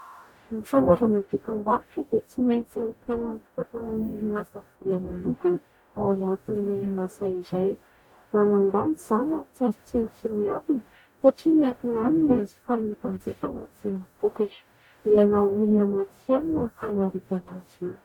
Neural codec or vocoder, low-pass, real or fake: codec, 44.1 kHz, 0.9 kbps, DAC; 19.8 kHz; fake